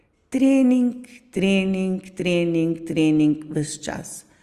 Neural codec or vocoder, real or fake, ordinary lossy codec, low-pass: none; real; Opus, 32 kbps; 14.4 kHz